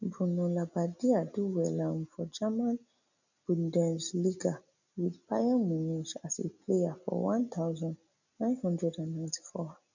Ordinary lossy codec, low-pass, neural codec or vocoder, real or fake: none; 7.2 kHz; none; real